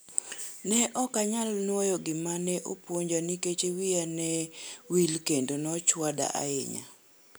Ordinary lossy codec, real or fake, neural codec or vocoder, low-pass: none; real; none; none